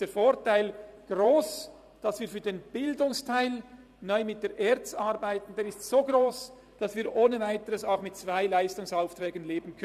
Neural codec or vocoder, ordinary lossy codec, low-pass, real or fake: vocoder, 44.1 kHz, 128 mel bands every 512 samples, BigVGAN v2; none; 14.4 kHz; fake